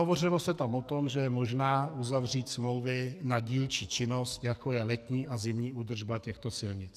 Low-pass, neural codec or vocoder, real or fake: 14.4 kHz; codec, 44.1 kHz, 2.6 kbps, SNAC; fake